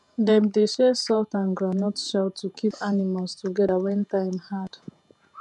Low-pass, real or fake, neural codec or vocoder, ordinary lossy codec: 10.8 kHz; fake; vocoder, 48 kHz, 128 mel bands, Vocos; none